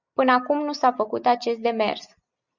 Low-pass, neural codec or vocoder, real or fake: 7.2 kHz; none; real